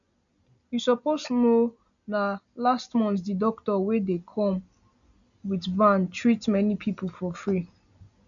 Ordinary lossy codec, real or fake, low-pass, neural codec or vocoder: none; real; 7.2 kHz; none